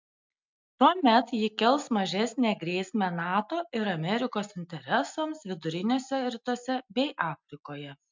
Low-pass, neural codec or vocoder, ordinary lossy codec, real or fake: 7.2 kHz; none; MP3, 64 kbps; real